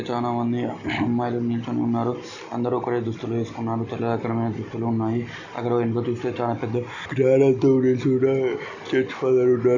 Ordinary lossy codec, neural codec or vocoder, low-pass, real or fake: none; none; 7.2 kHz; real